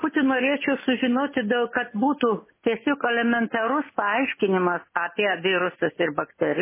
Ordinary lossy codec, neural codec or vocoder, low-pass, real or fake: MP3, 16 kbps; none; 3.6 kHz; real